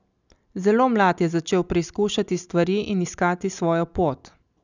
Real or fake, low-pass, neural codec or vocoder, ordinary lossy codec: real; 7.2 kHz; none; none